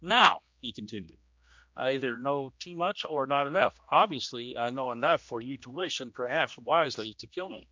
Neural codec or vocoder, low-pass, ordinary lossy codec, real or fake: codec, 16 kHz, 1 kbps, X-Codec, HuBERT features, trained on general audio; 7.2 kHz; MP3, 64 kbps; fake